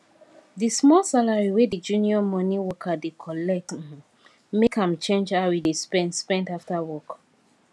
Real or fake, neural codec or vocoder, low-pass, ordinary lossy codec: real; none; none; none